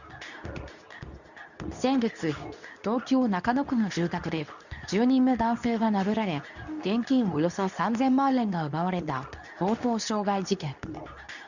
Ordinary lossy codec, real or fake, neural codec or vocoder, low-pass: none; fake; codec, 24 kHz, 0.9 kbps, WavTokenizer, medium speech release version 2; 7.2 kHz